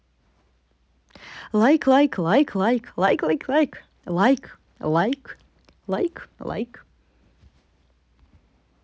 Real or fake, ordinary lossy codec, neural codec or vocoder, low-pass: real; none; none; none